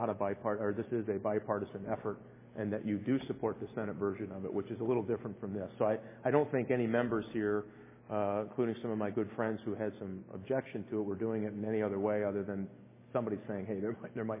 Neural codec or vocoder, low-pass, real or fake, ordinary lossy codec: none; 3.6 kHz; real; MP3, 16 kbps